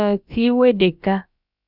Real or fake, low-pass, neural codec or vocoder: fake; 5.4 kHz; codec, 16 kHz, about 1 kbps, DyCAST, with the encoder's durations